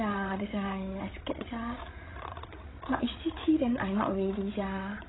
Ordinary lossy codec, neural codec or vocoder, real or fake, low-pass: AAC, 16 kbps; codec, 16 kHz, 16 kbps, FreqCodec, larger model; fake; 7.2 kHz